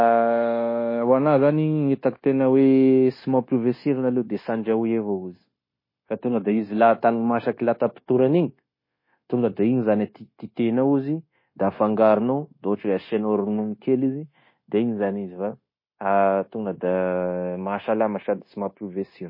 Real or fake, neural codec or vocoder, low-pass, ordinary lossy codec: fake; codec, 16 kHz, 0.9 kbps, LongCat-Audio-Codec; 5.4 kHz; MP3, 24 kbps